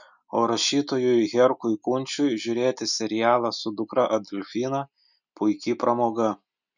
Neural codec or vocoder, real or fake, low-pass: none; real; 7.2 kHz